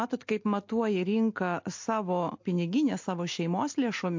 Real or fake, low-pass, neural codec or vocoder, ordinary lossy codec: real; 7.2 kHz; none; MP3, 48 kbps